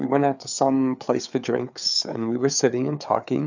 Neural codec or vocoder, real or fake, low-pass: codec, 16 kHz, 4 kbps, FreqCodec, larger model; fake; 7.2 kHz